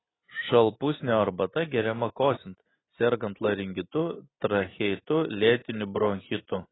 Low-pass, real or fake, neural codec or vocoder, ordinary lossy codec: 7.2 kHz; real; none; AAC, 16 kbps